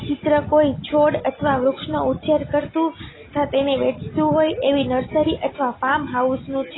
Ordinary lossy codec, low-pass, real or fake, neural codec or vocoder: AAC, 16 kbps; 7.2 kHz; real; none